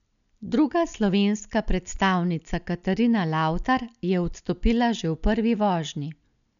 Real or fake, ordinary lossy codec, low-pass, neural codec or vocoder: real; none; 7.2 kHz; none